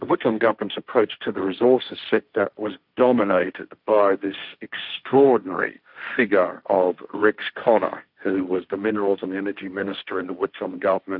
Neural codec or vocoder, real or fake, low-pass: codec, 16 kHz, 1.1 kbps, Voila-Tokenizer; fake; 5.4 kHz